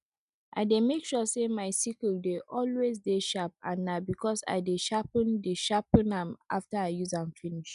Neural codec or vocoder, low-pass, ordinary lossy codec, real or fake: none; 10.8 kHz; none; real